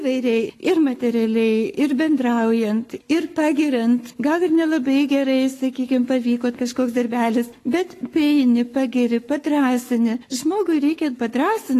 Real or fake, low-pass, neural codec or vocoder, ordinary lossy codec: fake; 14.4 kHz; codec, 44.1 kHz, 7.8 kbps, Pupu-Codec; AAC, 48 kbps